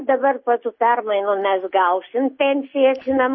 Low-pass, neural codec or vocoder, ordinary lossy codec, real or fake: 7.2 kHz; none; MP3, 24 kbps; real